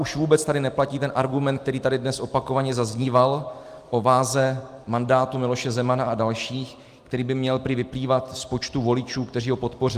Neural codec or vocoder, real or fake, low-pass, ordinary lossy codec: none; real; 14.4 kHz; Opus, 32 kbps